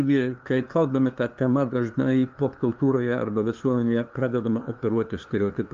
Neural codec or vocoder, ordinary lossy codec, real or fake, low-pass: codec, 16 kHz, 2 kbps, FunCodec, trained on LibriTTS, 25 frames a second; Opus, 32 kbps; fake; 7.2 kHz